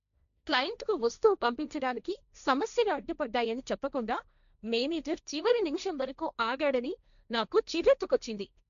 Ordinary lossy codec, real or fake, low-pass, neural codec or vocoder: none; fake; 7.2 kHz; codec, 16 kHz, 1.1 kbps, Voila-Tokenizer